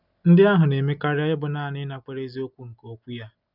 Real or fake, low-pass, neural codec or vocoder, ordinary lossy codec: real; 5.4 kHz; none; none